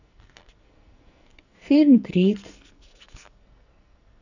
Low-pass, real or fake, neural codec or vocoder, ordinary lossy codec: 7.2 kHz; fake; codec, 44.1 kHz, 2.6 kbps, SNAC; none